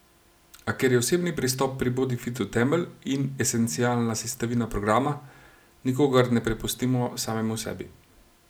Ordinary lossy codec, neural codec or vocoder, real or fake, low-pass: none; none; real; none